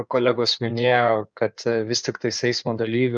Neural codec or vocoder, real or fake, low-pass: codec, 16 kHz in and 24 kHz out, 2.2 kbps, FireRedTTS-2 codec; fake; 9.9 kHz